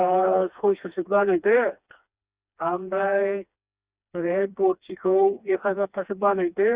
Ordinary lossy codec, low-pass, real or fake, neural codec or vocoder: Opus, 64 kbps; 3.6 kHz; fake; codec, 16 kHz, 2 kbps, FreqCodec, smaller model